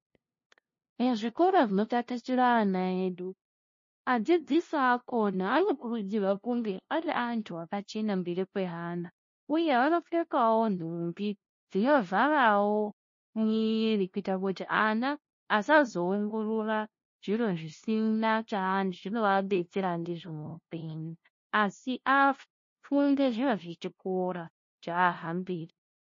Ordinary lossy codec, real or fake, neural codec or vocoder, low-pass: MP3, 32 kbps; fake; codec, 16 kHz, 0.5 kbps, FunCodec, trained on LibriTTS, 25 frames a second; 7.2 kHz